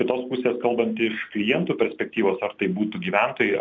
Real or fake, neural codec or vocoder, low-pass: real; none; 7.2 kHz